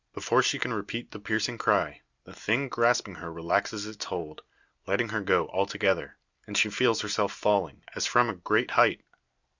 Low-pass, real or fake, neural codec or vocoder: 7.2 kHz; real; none